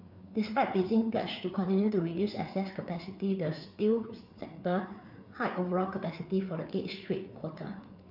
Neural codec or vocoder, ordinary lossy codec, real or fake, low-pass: codec, 16 kHz, 4 kbps, FreqCodec, larger model; none; fake; 5.4 kHz